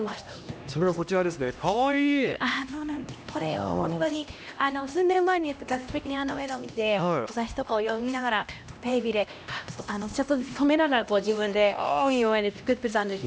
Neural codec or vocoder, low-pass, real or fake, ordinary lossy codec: codec, 16 kHz, 1 kbps, X-Codec, HuBERT features, trained on LibriSpeech; none; fake; none